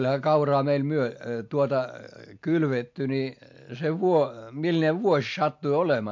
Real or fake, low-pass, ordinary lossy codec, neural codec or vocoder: real; 7.2 kHz; MP3, 48 kbps; none